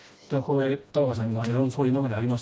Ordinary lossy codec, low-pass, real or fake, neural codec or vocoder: none; none; fake; codec, 16 kHz, 1 kbps, FreqCodec, smaller model